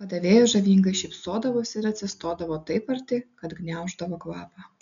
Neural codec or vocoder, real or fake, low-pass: none; real; 7.2 kHz